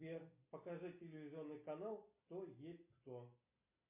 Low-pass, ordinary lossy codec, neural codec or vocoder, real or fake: 3.6 kHz; MP3, 24 kbps; none; real